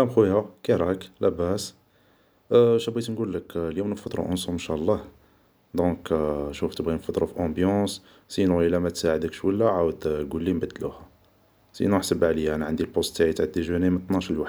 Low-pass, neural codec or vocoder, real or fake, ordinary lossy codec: none; none; real; none